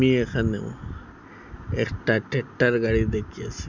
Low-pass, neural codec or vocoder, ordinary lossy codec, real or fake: 7.2 kHz; none; none; real